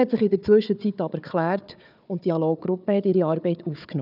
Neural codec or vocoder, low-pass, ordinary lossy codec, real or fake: codec, 16 kHz, 4 kbps, FunCodec, trained on Chinese and English, 50 frames a second; 5.4 kHz; none; fake